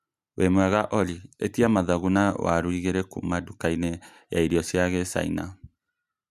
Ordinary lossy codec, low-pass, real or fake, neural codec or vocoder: none; 14.4 kHz; real; none